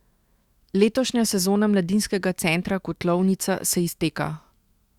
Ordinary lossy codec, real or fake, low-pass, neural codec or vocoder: Opus, 64 kbps; fake; 19.8 kHz; autoencoder, 48 kHz, 128 numbers a frame, DAC-VAE, trained on Japanese speech